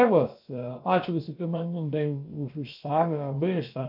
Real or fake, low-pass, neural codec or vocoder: fake; 5.4 kHz; codec, 16 kHz, about 1 kbps, DyCAST, with the encoder's durations